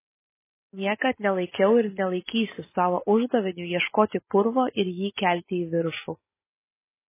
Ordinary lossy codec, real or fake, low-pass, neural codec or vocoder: MP3, 16 kbps; real; 3.6 kHz; none